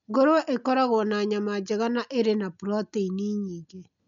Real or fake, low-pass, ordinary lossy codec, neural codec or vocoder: real; 7.2 kHz; none; none